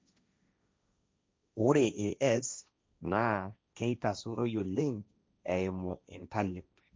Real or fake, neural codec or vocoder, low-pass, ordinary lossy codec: fake; codec, 16 kHz, 1.1 kbps, Voila-Tokenizer; none; none